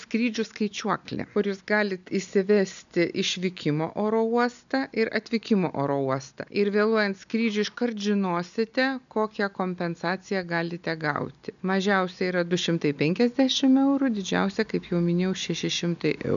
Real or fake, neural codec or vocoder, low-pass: real; none; 7.2 kHz